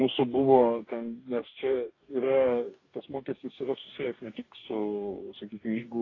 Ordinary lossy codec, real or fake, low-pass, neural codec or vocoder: AAC, 32 kbps; fake; 7.2 kHz; codec, 44.1 kHz, 2.6 kbps, DAC